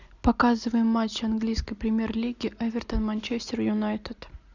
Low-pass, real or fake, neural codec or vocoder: 7.2 kHz; real; none